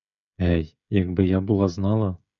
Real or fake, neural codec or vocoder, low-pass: fake; codec, 16 kHz, 16 kbps, FreqCodec, smaller model; 7.2 kHz